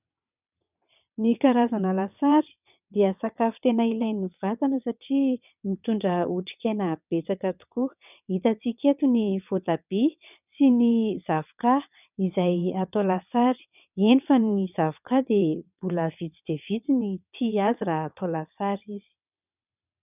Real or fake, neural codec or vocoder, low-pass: fake; vocoder, 22.05 kHz, 80 mel bands, WaveNeXt; 3.6 kHz